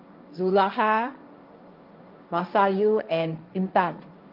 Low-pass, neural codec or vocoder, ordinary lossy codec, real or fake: 5.4 kHz; codec, 16 kHz, 1.1 kbps, Voila-Tokenizer; Opus, 24 kbps; fake